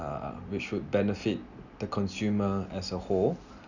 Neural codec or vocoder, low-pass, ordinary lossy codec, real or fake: none; 7.2 kHz; none; real